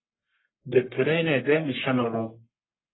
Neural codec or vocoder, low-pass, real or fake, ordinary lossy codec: codec, 44.1 kHz, 1.7 kbps, Pupu-Codec; 7.2 kHz; fake; AAC, 16 kbps